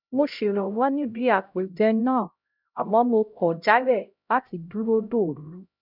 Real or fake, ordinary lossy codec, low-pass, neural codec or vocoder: fake; none; 5.4 kHz; codec, 16 kHz, 0.5 kbps, X-Codec, HuBERT features, trained on LibriSpeech